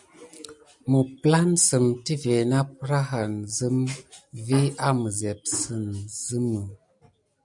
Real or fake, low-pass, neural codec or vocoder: real; 10.8 kHz; none